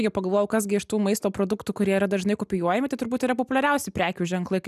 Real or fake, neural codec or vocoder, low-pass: real; none; 14.4 kHz